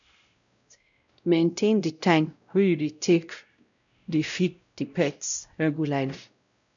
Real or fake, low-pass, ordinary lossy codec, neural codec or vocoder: fake; 7.2 kHz; none; codec, 16 kHz, 0.5 kbps, X-Codec, WavLM features, trained on Multilingual LibriSpeech